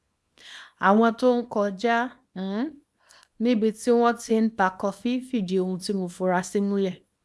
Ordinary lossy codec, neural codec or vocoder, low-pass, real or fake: none; codec, 24 kHz, 0.9 kbps, WavTokenizer, small release; none; fake